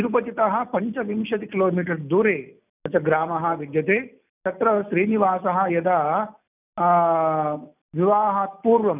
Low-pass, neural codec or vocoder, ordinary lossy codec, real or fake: 3.6 kHz; none; none; real